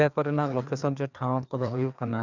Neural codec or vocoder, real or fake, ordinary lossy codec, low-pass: codec, 16 kHz, 2 kbps, FreqCodec, larger model; fake; none; 7.2 kHz